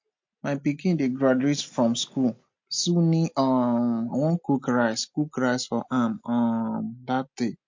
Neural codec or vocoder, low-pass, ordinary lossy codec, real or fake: none; 7.2 kHz; MP3, 48 kbps; real